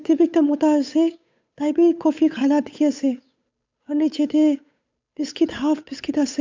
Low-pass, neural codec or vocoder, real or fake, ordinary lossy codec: 7.2 kHz; codec, 16 kHz, 8 kbps, FunCodec, trained on Chinese and English, 25 frames a second; fake; MP3, 64 kbps